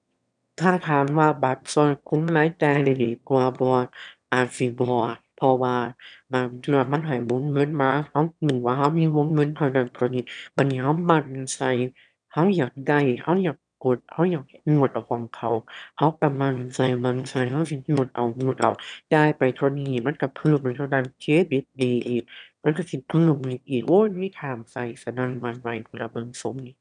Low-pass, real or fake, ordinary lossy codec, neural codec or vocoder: 9.9 kHz; fake; none; autoencoder, 22.05 kHz, a latent of 192 numbers a frame, VITS, trained on one speaker